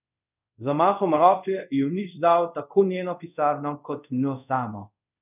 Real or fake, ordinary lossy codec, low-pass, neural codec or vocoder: fake; none; 3.6 kHz; codec, 24 kHz, 0.5 kbps, DualCodec